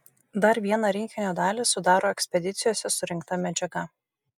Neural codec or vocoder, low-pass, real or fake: none; 19.8 kHz; real